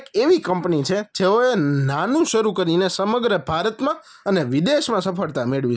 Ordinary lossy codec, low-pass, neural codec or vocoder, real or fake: none; none; none; real